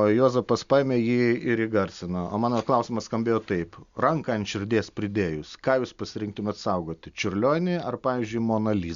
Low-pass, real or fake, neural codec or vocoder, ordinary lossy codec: 7.2 kHz; real; none; Opus, 64 kbps